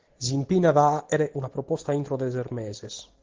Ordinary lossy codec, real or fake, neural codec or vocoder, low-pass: Opus, 16 kbps; real; none; 7.2 kHz